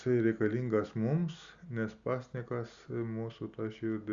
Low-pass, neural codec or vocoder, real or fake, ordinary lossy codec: 7.2 kHz; none; real; Opus, 64 kbps